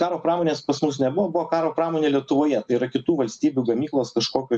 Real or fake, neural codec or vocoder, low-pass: real; none; 9.9 kHz